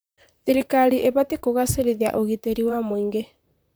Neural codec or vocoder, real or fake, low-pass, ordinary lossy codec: vocoder, 44.1 kHz, 128 mel bands, Pupu-Vocoder; fake; none; none